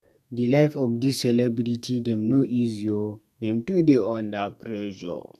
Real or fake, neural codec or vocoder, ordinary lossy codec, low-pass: fake; codec, 32 kHz, 1.9 kbps, SNAC; none; 14.4 kHz